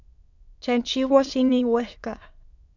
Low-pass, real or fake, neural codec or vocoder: 7.2 kHz; fake; autoencoder, 22.05 kHz, a latent of 192 numbers a frame, VITS, trained on many speakers